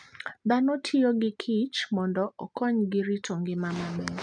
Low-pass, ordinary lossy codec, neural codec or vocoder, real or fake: 9.9 kHz; MP3, 96 kbps; none; real